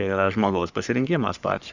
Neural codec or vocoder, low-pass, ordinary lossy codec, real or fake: codec, 44.1 kHz, 3.4 kbps, Pupu-Codec; 7.2 kHz; Opus, 64 kbps; fake